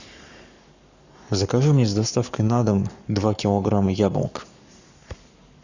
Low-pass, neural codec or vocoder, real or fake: 7.2 kHz; codec, 44.1 kHz, 7.8 kbps, Pupu-Codec; fake